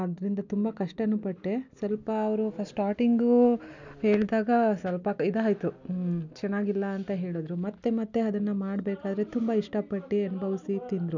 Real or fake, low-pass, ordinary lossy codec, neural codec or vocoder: real; 7.2 kHz; none; none